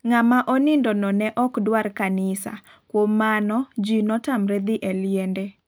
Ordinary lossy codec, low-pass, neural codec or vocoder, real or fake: none; none; none; real